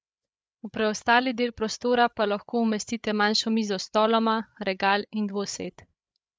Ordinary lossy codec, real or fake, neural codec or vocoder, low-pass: none; fake; codec, 16 kHz, 8 kbps, FreqCodec, larger model; none